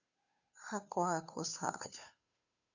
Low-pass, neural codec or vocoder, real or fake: 7.2 kHz; codec, 16 kHz, 2 kbps, FunCodec, trained on Chinese and English, 25 frames a second; fake